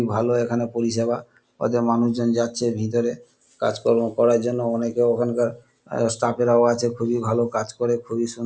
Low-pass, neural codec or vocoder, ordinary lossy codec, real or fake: none; none; none; real